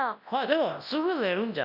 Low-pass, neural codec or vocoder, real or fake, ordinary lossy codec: 5.4 kHz; codec, 24 kHz, 0.9 kbps, WavTokenizer, large speech release; fake; none